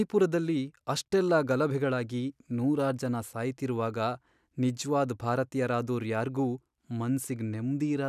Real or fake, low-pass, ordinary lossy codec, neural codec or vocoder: real; 14.4 kHz; none; none